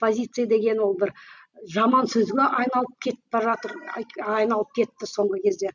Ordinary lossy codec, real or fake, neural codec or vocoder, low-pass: none; real; none; 7.2 kHz